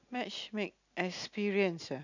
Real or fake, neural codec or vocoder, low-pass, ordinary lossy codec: real; none; 7.2 kHz; none